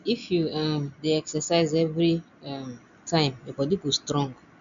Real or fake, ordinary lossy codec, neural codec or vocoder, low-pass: real; none; none; 7.2 kHz